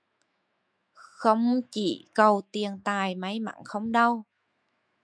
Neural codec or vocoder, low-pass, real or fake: autoencoder, 48 kHz, 128 numbers a frame, DAC-VAE, trained on Japanese speech; 9.9 kHz; fake